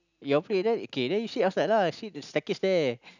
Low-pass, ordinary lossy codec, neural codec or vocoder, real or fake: 7.2 kHz; none; none; real